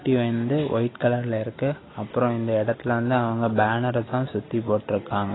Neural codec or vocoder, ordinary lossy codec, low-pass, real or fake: none; AAC, 16 kbps; 7.2 kHz; real